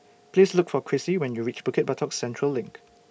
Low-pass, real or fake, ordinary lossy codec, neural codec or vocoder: none; real; none; none